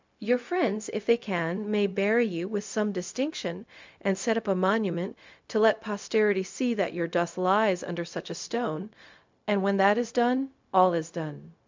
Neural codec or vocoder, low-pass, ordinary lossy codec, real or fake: codec, 16 kHz, 0.4 kbps, LongCat-Audio-Codec; 7.2 kHz; MP3, 64 kbps; fake